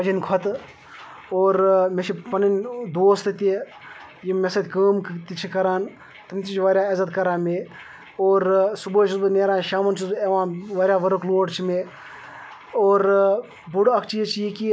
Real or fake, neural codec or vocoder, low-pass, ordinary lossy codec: real; none; none; none